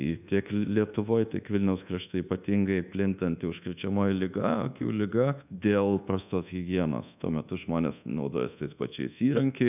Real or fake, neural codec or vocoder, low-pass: fake; codec, 24 kHz, 1.2 kbps, DualCodec; 3.6 kHz